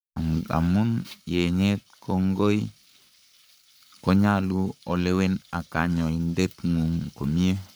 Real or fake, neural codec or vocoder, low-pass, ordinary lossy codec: fake; codec, 44.1 kHz, 7.8 kbps, Pupu-Codec; none; none